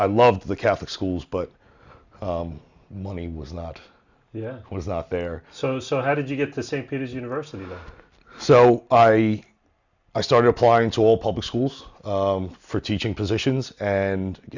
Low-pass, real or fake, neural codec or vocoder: 7.2 kHz; real; none